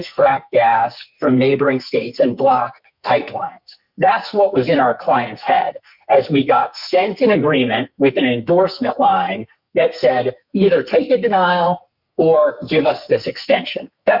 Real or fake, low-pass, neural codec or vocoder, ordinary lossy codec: fake; 5.4 kHz; codec, 44.1 kHz, 2.6 kbps, SNAC; Opus, 64 kbps